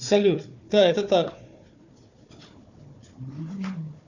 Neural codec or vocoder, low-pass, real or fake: codec, 16 kHz, 4 kbps, FunCodec, trained on Chinese and English, 50 frames a second; 7.2 kHz; fake